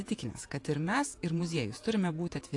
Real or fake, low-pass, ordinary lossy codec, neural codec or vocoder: fake; 10.8 kHz; AAC, 48 kbps; vocoder, 48 kHz, 128 mel bands, Vocos